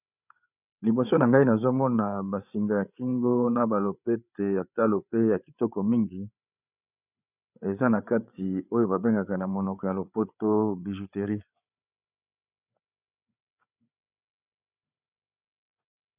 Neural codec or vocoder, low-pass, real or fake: codec, 16 kHz, 8 kbps, FreqCodec, larger model; 3.6 kHz; fake